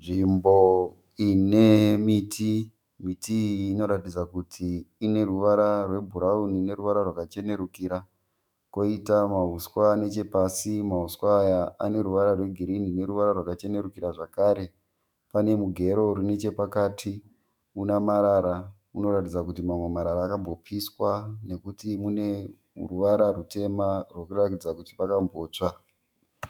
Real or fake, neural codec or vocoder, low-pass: fake; autoencoder, 48 kHz, 128 numbers a frame, DAC-VAE, trained on Japanese speech; 19.8 kHz